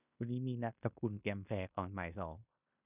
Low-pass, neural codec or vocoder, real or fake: 3.6 kHz; codec, 16 kHz in and 24 kHz out, 0.9 kbps, LongCat-Audio-Codec, fine tuned four codebook decoder; fake